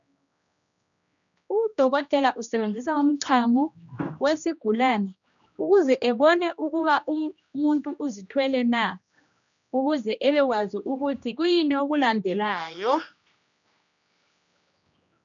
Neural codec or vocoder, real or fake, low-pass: codec, 16 kHz, 1 kbps, X-Codec, HuBERT features, trained on general audio; fake; 7.2 kHz